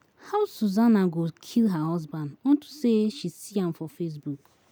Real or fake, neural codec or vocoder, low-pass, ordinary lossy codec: real; none; none; none